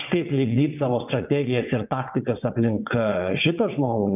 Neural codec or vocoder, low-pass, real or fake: vocoder, 22.05 kHz, 80 mel bands, WaveNeXt; 3.6 kHz; fake